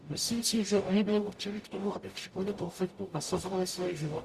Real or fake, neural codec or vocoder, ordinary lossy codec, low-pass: fake; codec, 44.1 kHz, 0.9 kbps, DAC; Opus, 64 kbps; 14.4 kHz